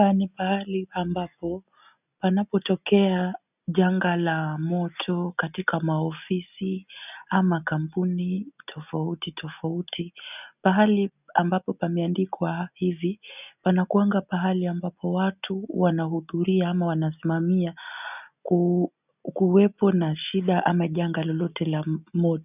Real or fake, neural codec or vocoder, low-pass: real; none; 3.6 kHz